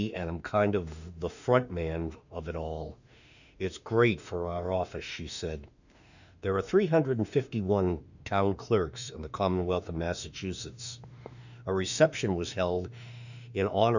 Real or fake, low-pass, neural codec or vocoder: fake; 7.2 kHz; autoencoder, 48 kHz, 32 numbers a frame, DAC-VAE, trained on Japanese speech